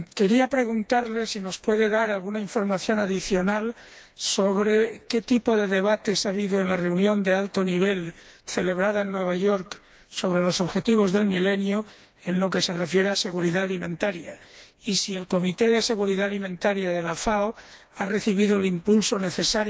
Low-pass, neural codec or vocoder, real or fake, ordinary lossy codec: none; codec, 16 kHz, 2 kbps, FreqCodec, smaller model; fake; none